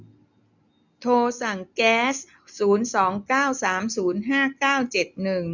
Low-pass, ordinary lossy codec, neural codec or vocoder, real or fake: 7.2 kHz; none; none; real